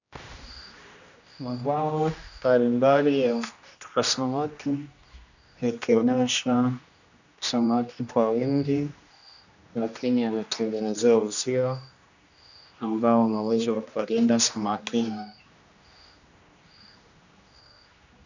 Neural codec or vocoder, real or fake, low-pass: codec, 16 kHz, 1 kbps, X-Codec, HuBERT features, trained on general audio; fake; 7.2 kHz